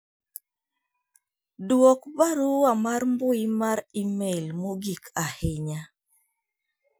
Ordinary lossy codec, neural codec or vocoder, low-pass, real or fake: none; none; none; real